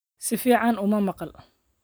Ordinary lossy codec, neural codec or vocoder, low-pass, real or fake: none; none; none; real